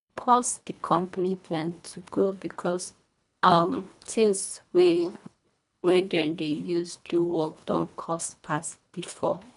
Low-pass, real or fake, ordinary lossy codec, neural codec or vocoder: 10.8 kHz; fake; none; codec, 24 kHz, 1.5 kbps, HILCodec